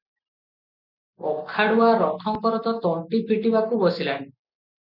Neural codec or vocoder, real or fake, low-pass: none; real; 5.4 kHz